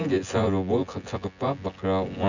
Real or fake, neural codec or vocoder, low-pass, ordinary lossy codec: fake; vocoder, 24 kHz, 100 mel bands, Vocos; 7.2 kHz; none